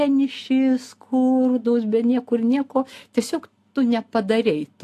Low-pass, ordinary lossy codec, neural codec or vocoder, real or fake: 14.4 kHz; AAC, 64 kbps; none; real